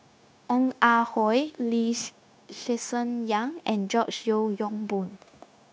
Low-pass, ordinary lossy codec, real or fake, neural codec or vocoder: none; none; fake; codec, 16 kHz, 0.9 kbps, LongCat-Audio-Codec